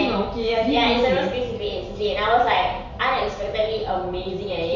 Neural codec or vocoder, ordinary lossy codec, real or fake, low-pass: none; Opus, 64 kbps; real; 7.2 kHz